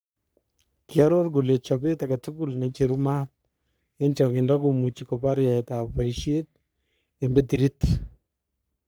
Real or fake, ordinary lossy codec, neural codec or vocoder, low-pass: fake; none; codec, 44.1 kHz, 3.4 kbps, Pupu-Codec; none